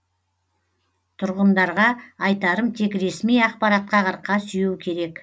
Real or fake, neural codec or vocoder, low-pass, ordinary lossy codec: real; none; none; none